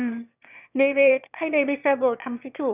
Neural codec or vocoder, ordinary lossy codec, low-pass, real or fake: autoencoder, 22.05 kHz, a latent of 192 numbers a frame, VITS, trained on one speaker; none; 3.6 kHz; fake